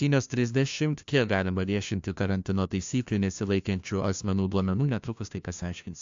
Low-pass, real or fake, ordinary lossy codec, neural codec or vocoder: 7.2 kHz; fake; AAC, 64 kbps; codec, 16 kHz, 1 kbps, FunCodec, trained on LibriTTS, 50 frames a second